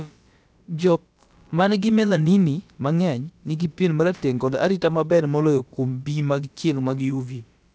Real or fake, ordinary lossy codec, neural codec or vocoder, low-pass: fake; none; codec, 16 kHz, about 1 kbps, DyCAST, with the encoder's durations; none